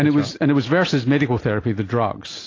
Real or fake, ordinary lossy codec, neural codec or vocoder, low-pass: real; AAC, 32 kbps; none; 7.2 kHz